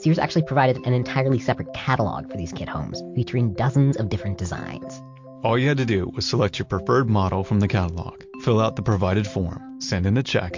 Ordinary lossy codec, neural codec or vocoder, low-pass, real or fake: MP3, 48 kbps; none; 7.2 kHz; real